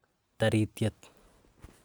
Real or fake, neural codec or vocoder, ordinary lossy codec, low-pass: real; none; none; none